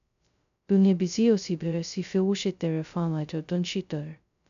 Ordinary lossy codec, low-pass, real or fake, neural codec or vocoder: none; 7.2 kHz; fake; codec, 16 kHz, 0.2 kbps, FocalCodec